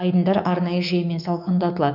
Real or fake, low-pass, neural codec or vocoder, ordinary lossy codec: fake; 5.4 kHz; codec, 24 kHz, 3.1 kbps, DualCodec; none